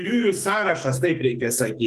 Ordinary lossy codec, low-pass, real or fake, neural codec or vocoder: Opus, 32 kbps; 14.4 kHz; fake; codec, 44.1 kHz, 2.6 kbps, SNAC